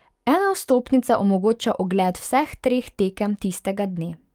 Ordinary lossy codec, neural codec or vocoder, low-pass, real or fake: Opus, 32 kbps; codec, 44.1 kHz, 7.8 kbps, DAC; 19.8 kHz; fake